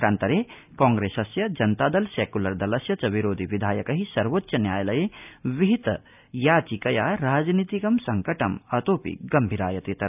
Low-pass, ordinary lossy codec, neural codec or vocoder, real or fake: 3.6 kHz; none; none; real